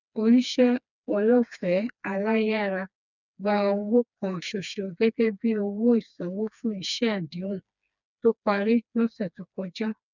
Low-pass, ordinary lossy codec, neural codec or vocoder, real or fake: 7.2 kHz; none; codec, 16 kHz, 2 kbps, FreqCodec, smaller model; fake